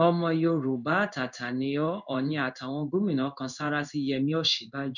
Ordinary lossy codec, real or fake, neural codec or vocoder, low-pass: none; fake; codec, 16 kHz in and 24 kHz out, 1 kbps, XY-Tokenizer; 7.2 kHz